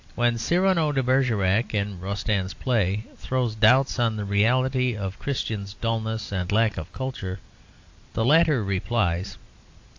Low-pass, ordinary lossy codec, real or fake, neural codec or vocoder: 7.2 kHz; AAC, 48 kbps; real; none